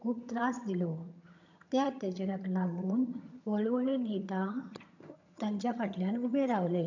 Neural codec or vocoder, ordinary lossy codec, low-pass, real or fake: vocoder, 22.05 kHz, 80 mel bands, HiFi-GAN; none; 7.2 kHz; fake